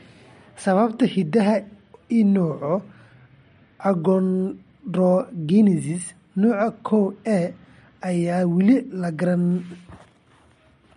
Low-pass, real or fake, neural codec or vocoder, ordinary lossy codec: 19.8 kHz; real; none; MP3, 48 kbps